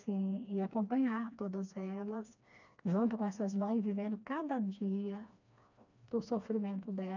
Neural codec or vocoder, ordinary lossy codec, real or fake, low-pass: codec, 16 kHz, 2 kbps, FreqCodec, smaller model; none; fake; 7.2 kHz